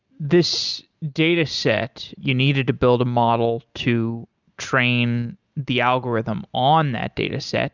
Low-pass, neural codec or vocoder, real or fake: 7.2 kHz; none; real